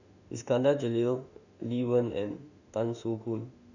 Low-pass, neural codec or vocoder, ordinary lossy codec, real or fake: 7.2 kHz; autoencoder, 48 kHz, 32 numbers a frame, DAC-VAE, trained on Japanese speech; none; fake